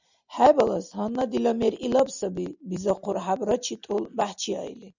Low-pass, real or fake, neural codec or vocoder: 7.2 kHz; real; none